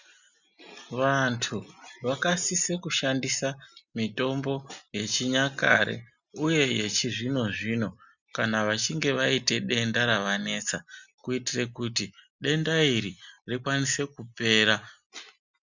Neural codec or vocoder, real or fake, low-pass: none; real; 7.2 kHz